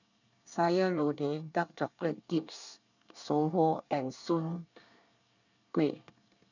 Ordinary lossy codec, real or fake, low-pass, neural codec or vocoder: none; fake; 7.2 kHz; codec, 24 kHz, 1 kbps, SNAC